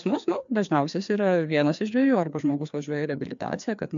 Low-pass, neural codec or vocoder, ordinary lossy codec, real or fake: 7.2 kHz; codec, 16 kHz, 2 kbps, FreqCodec, larger model; MP3, 64 kbps; fake